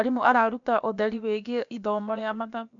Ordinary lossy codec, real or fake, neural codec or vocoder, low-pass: none; fake; codec, 16 kHz, about 1 kbps, DyCAST, with the encoder's durations; 7.2 kHz